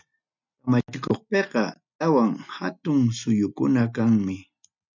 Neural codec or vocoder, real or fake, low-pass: none; real; 7.2 kHz